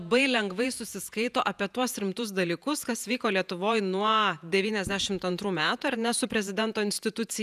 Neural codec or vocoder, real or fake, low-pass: vocoder, 48 kHz, 128 mel bands, Vocos; fake; 14.4 kHz